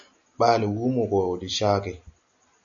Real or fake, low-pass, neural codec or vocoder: real; 7.2 kHz; none